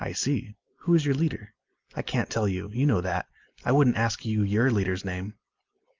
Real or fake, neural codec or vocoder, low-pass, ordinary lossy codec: real; none; 7.2 kHz; Opus, 24 kbps